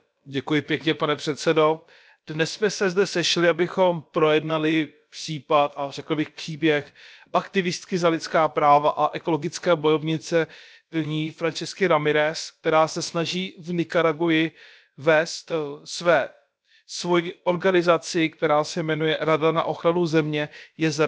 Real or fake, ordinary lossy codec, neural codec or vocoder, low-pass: fake; none; codec, 16 kHz, about 1 kbps, DyCAST, with the encoder's durations; none